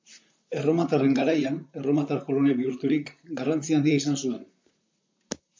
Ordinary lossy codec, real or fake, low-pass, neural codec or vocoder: MP3, 64 kbps; fake; 7.2 kHz; vocoder, 44.1 kHz, 128 mel bands, Pupu-Vocoder